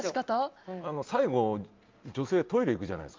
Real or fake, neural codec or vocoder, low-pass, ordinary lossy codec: real; none; 7.2 kHz; Opus, 32 kbps